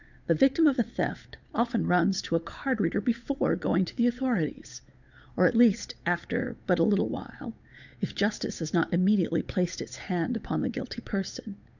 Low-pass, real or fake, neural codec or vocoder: 7.2 kHz; fake; codec, 16 kHz, 8 kbps, FunCodec, trained on Chinese and English, 25 frames a second